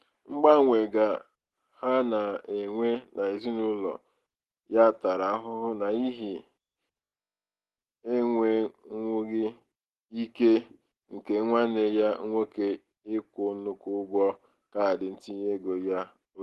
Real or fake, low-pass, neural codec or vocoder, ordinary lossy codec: real; 14.4 kHz; none; Opus, 16 kbps